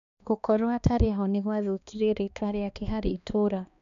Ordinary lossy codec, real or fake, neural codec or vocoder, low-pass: none; fake; codec, 16 kHz, 2 kbps, X-Codec, HuBERT features, trained on balanced general audio; 7.2 kHz